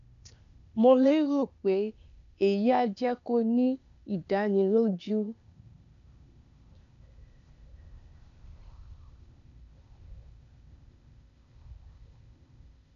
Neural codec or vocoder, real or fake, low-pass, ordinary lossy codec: codec, 16 kHz, 0.8 kbps, ZipCodec; fake; 7.2 kHz; none